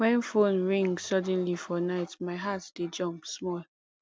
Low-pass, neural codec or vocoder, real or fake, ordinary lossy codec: none; none; real; none